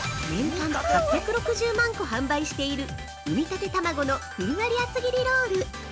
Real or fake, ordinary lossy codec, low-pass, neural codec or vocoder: real; none; none; none